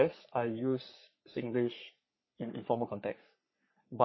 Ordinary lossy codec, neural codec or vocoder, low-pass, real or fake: MP3, 24 kbps; codec, 44.1 kHz, 3.4 kbps, Pupu-Codec; 7.2 kHz; fake